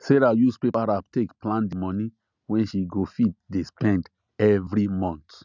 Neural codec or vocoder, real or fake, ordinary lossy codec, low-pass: none; real; none; 7.2 kHz